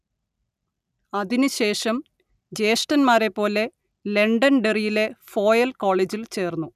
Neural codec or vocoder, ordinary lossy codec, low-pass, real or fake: none; none; 14.4 kHz; real